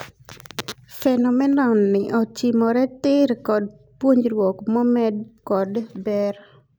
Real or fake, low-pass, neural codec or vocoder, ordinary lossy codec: real; none; none; none